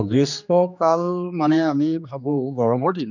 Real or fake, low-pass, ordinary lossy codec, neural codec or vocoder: fake; 7.2 kHz; none; codec, 16 kHz, 2 kbps, X-Codec, HuBERT features, trained on general audio